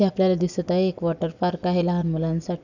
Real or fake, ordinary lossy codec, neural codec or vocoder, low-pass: real; none; none; 7.2 kHz